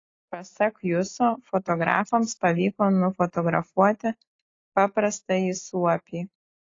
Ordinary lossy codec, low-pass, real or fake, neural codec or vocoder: AAC, 32 kbps; 7.2 kHz; real; none